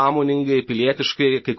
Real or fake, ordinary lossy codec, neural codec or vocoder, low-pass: real; MP3, 24 kbps; none; 7.2 kHz